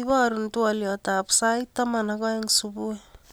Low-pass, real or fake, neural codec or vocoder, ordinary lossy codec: none; real; none; none